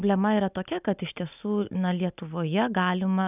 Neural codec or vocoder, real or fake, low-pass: none; real; 3.6 kHz